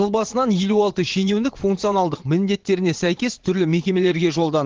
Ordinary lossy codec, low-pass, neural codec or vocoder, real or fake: Opus, 16 kbps; 7.2 kHz; vocoder, 22.05 kHz, 80 mel bands, WaveNeXt; fake